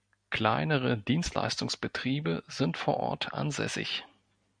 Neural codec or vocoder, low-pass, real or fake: none; 9.9 kHz; real